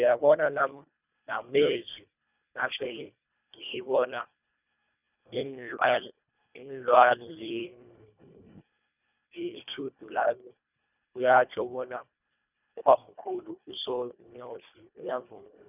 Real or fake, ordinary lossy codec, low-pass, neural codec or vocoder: fake; none; 3.6 kHz; codec, 24 kHz, 1.5 kbps, HILCodec